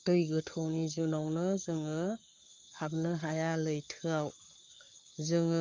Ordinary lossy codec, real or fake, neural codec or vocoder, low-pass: Opus, 32 kbps; fake; codec, 44.1 kHz, 7.8 kbps, Pupu-Codec; 7.2 kHz